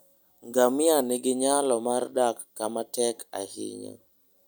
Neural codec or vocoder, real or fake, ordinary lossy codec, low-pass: none; real; none; none